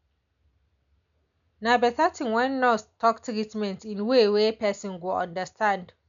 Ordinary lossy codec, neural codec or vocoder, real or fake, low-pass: none; none; real; 7.2 kHz